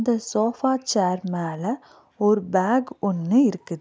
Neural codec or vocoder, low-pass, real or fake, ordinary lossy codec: none; none; real; none